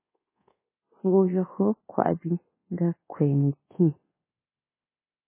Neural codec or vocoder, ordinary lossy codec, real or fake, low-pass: codec, 24 kHz, 1.2 kbps, DualCodec; MP3, 16 kbps; fake; 3.6 kHz